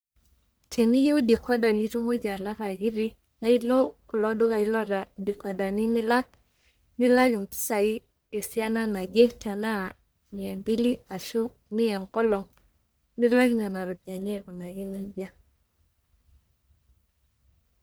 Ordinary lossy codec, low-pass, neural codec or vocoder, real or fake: none; none; codec, 44.1 kHz, 1.7 kbps, Pupu-Codec; fake